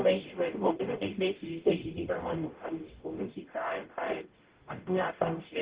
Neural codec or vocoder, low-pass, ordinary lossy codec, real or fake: codec, 44.1 kHz, 0.9 kbps, DAC; 3.6 kHz; Opus, 16 kbps; fake